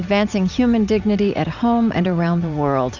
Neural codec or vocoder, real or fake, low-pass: vocoder, 44.1 kHz, 80 mel bands, Vocos; fake; 7.2 kHz